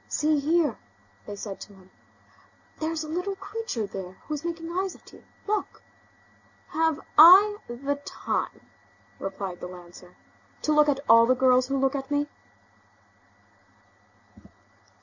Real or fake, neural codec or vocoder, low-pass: real; none; 7.2 kHz